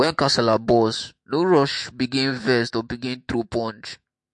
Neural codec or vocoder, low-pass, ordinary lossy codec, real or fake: autoencoder, 48 kHz, 128 numbers a frame, DAC-VAE, trained on Japanese speech; 10.8 kHz; MP3, 48 kbps; fake